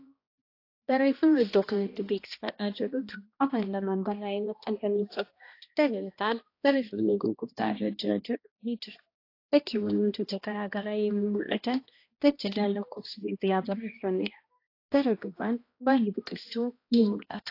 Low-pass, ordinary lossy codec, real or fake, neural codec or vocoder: 5.4 kHz; AAC, 32 kbps; fake; codec, 16 kHz, 1 kbps, X-Codec, HuBERT features, trained on balanced general audio